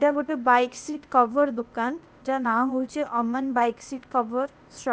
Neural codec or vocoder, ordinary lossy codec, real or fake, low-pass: codec, 16 kHz, 0.8 kbps, ZipCodec; none; fake; none